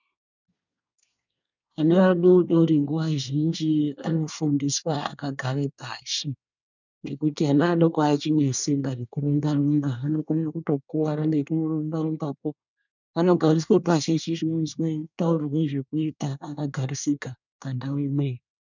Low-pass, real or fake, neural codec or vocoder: 7.2 kHz; fake; codec, 24 kHz, 1 kbps, SNAC